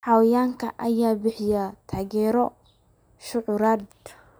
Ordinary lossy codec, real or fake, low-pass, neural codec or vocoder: none; real; none; none